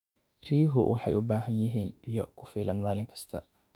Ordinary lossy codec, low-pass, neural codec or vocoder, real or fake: none; 19.8 kHz; autoencoder, 48 kHz, 32 numbers a frame, DAC-VAE, trained on Japanese speech; fake